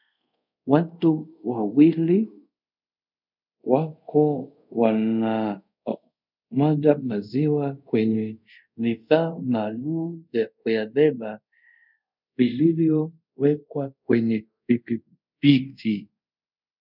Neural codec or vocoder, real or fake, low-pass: codec, 24 kHz, 0.5 kbps, DualCodec; fake; 5.4 kHz